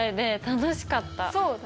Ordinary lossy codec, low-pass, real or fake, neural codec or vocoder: none; none; real; none